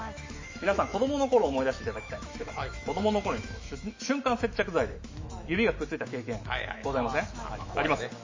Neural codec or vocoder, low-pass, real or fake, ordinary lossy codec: autoencoder, 48 kHz, 128 numbers a frame, DAC-VAE, trained on Japanese speech; 7.2 kHz; fake; MP3, 32 kbps